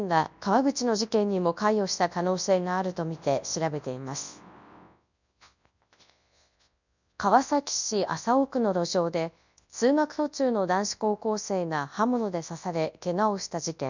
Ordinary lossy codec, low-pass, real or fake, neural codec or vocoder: none; 7.2 kHz; fake; codec, 24 kHz, 0.9 kbps, WavTokenizer, large speech release